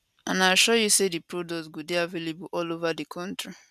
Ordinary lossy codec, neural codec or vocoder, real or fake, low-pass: none; none; real; 14.4 kHz